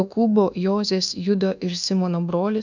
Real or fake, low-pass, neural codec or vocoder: fake; 7.2 kHz; codec, 24 kHz, 1.2 kbps, DualCodec